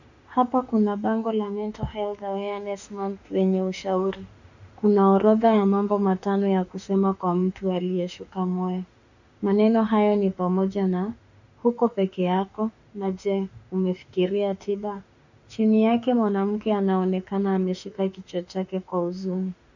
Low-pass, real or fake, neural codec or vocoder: 7.2 kHz; fake; autoencoder, 48 kHz, 32 numbers a frame, DAC-VAE, trained on Japanese speech